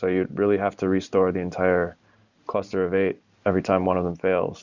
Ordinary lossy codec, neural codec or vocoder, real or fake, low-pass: Opus, 64 kbps; none; real; 7.2 kHz